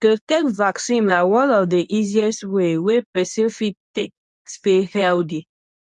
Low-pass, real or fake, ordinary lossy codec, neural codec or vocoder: 10.8 kHz; fake; none; codec, 24 kHz, 0.9 kbps, WavTokenizer, medium speech release version 2